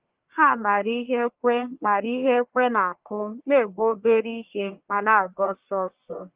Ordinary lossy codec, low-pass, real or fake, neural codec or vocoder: Opus, 32 kbps; 3.6 kHz; fake; codec, 44.1 kHz, 1.7 kbps, Pupu-Codec